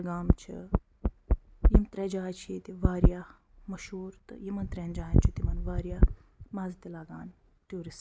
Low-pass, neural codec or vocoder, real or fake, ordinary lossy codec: none; none; real; none